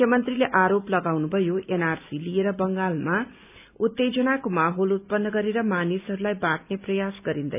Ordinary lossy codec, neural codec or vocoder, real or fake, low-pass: none; none; real; 3.6 kHz